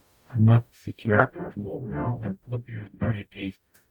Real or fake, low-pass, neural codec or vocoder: fake; 19.8 kHz; codec, 44.1 kHz, 0.9 kbps, DAC